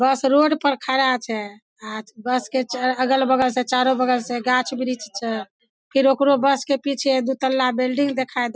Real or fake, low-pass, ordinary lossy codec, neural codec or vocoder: real; none; none; none